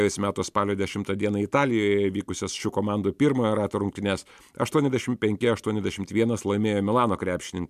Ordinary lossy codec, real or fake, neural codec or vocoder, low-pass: MP3, 96 kbps; real; none; 14.4 kHz